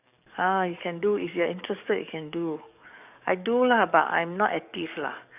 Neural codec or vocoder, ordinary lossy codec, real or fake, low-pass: codec, 44.1 kHz, 7.8 kbps, DAC; none; fake; 3.6 kHz